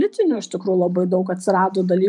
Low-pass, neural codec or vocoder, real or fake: 10.8 kHz; vocoder, 44.1 kHz, 128 mel bands every 512 samples, BigVGAN v2; fake